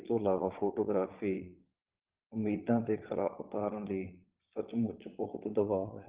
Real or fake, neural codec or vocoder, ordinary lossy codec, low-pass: fake; vocoder, 22.05 kHz, 80 mel bands, Vocos; Opus, 16 kbps; 3.6 kHz